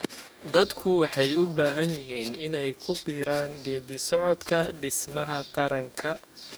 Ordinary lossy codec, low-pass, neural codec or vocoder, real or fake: none; none; codec, 44.1 kHz, 2.6 kbps, DAC; fake